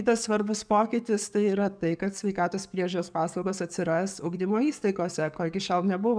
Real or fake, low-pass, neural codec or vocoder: fake; 9.9 kHz; codec, 44.1 kHz, 7.8 kbps, DAC